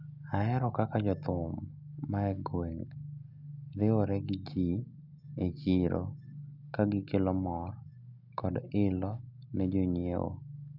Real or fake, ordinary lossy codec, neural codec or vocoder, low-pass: real; none; none; 5.4 kHz